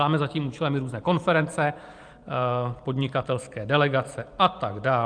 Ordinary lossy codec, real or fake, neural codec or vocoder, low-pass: Opus, 32 kbps; fake; vocoder, 44.1 kHz, 128 mel bands every 512 samples, BigVGAN v2; 9.9 kHz